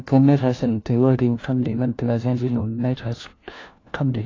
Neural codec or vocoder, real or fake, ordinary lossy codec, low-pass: codec, 16 kHz, 1 kbps, FunCodec, trained on LibriTTS, 50 frames a second; fake; AAC, 32 kbps; 7.2 kHz